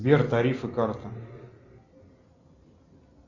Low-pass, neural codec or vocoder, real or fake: 7.2 kHz; none; real